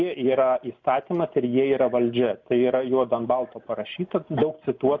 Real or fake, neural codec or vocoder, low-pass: real; none; 7.2 kHz